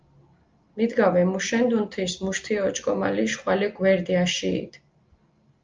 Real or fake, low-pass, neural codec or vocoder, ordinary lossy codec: real; 7.2 kHz; none; Opus, 24 kbps